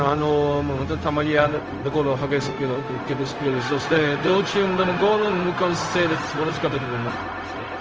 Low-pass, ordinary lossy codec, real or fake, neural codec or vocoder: 7.2 kHz; Opus, 24 kbps; fake; codec, 16 kHz, 0.4 kbps, LongCat-Audio-Codec